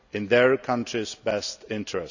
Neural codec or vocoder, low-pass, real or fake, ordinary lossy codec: none; 7.2 kHz; real; none